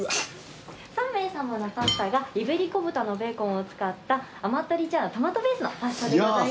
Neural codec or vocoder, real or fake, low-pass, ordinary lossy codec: none; real; none; none